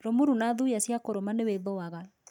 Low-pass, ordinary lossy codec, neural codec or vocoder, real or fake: 19.8 kHz; none; none; real